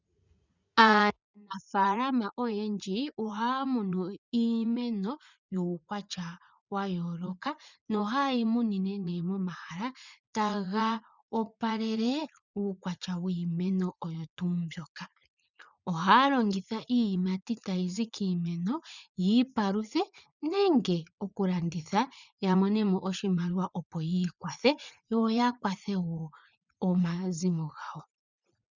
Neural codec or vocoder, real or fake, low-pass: vocoder, 44.1 kHz, 80 mel bands, Vocos; fake; 7.2 kHz